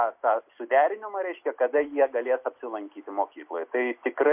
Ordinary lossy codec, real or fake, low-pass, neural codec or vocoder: MP3, 32 kbps; real; 3.6 kHz; none